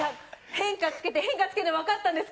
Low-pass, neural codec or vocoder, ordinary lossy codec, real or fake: none; none; none; real